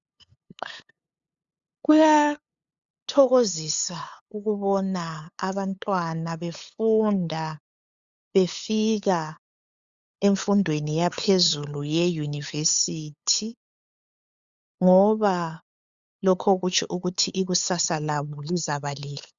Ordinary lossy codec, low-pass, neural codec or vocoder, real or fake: Opus, 64 kbps; 7.2 kHz; codec, 16 kHz, 8 kbps, FunCodec, trained on LibriTTS, 25 frames a second; fake